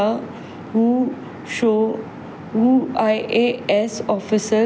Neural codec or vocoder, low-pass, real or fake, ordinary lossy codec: none; none; real; none